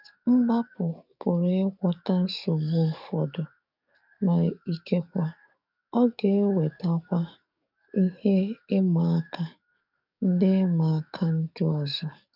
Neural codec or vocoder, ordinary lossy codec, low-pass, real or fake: codec, 44.1 kHz, 7.8 kbps, DAC; none; 5.4 kHz; fake